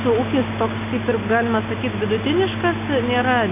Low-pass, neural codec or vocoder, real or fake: 3.6 kHz; none; real